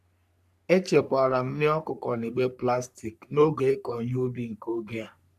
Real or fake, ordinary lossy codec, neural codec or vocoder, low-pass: fake; none; codec, 44.1 kHz, 3.4 kbps, Pupu-Codec; 14.4 kHz